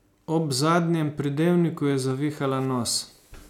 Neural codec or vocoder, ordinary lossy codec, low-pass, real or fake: none; none; 19.8 kHz; real